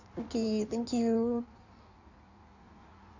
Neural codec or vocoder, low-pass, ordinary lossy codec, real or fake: codec, 16 kHz in and 24 kHz out, 1.1 kbps, FireRedTTS-2 codec; 7.2 kHz; none; fake